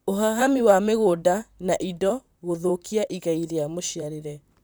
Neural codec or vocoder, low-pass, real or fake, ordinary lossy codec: vocoder, 44.1 kHz, 128 mel bands, Pupu-Vocoder; none; fake; none